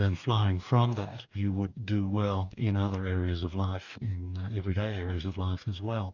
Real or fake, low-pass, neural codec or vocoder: fake; 7.2 kHz; codec, 44.1 kHz, 2.6 kbps, DAC